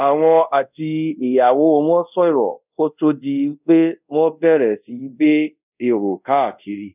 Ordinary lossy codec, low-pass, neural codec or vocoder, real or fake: none; 3.6 kHz; codec, 24 kHz, 0.5 kbps, DualCodec; fake